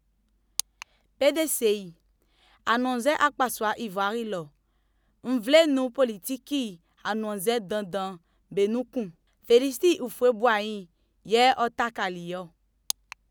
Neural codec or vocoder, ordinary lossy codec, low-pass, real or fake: none; none; none; real